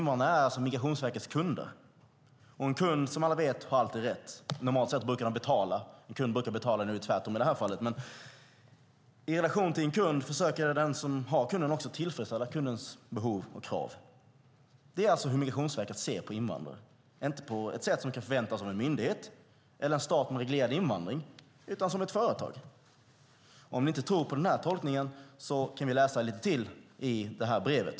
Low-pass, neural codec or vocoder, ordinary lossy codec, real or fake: none; none; none; real